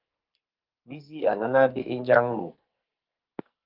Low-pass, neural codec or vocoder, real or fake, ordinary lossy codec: 5.4 kHz; codec, 44.1 kHz, 2.6 kbps, SNAC; fake; Opus, 24 kbps